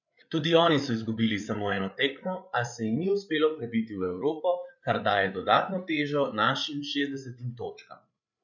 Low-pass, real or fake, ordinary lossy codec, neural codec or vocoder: 7.2 kHz; fake; none; codec, 16 kHz, 8 kbps, FreqCodec, larger model